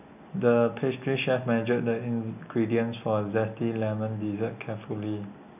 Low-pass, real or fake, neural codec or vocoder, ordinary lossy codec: 3.6 kHz; real; none; none